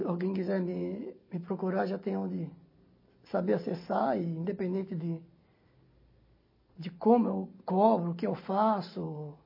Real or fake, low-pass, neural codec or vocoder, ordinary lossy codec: real; 5.4 kHz; none; MP3, 24 kbps